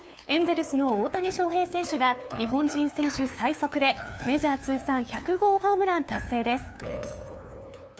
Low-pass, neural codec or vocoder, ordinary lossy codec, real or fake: none; codec, 16 kHz, 2 kbps, FunCodec, trained on LibriTTS, 25 frames a second; none; fake